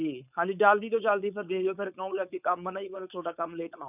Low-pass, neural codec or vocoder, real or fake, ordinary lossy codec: 3.6 kHz; codec, 16 kHz, 4.8 kbps, FACodec; fake; none